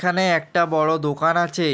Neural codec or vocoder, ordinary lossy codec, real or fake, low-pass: none; none; real; none